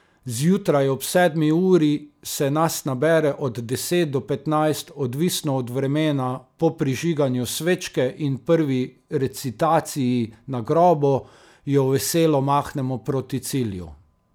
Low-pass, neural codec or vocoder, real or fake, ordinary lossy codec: none; none; real; none